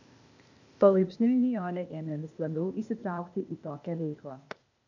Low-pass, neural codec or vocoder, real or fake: 7.2 kHz; codec, 16 kHz, 0.8 kbps, ZipCodec; fake